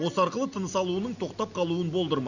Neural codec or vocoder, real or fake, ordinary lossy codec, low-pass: none; real; none; 7.2 kHz